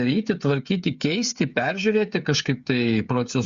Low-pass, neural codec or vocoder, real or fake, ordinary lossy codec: 7.2 kHz; codec, 16 kHz, 8 kbps, FreqCodec, smaller model; fake; Opus, 64 kbps